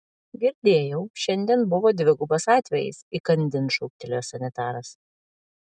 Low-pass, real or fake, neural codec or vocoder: 9.9 kHz; real; none